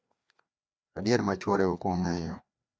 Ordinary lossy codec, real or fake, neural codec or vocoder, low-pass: none; fake; codec, 16 kHz, 2 kbps, FreqCodec, larger model; none